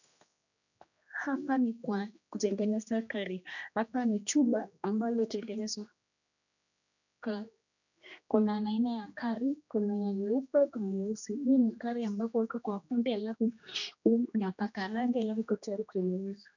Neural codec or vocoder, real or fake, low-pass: codec, 16 kHz, 1 kbps, X-Codec, HuBERT features, trained on general audio; fake; 7.2 kHz